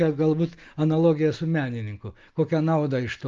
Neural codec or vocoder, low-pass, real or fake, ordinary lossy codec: none; 7.2 kHz; real; Opus, 32 kbps